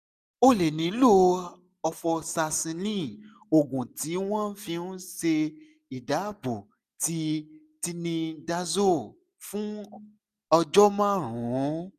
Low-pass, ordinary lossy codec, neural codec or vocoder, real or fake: 14.4 kHz; none; none; real